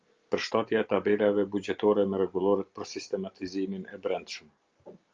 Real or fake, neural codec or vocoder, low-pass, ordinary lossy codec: real; none; 7.2 kHz; Opus, 24 kbps